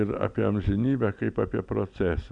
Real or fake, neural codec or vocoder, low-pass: real; none; 9.9 kHz